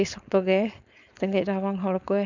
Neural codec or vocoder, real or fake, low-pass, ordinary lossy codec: codec, 16 kHz, 4.8 kbps, FACodec; fake; 7.2 kHz; none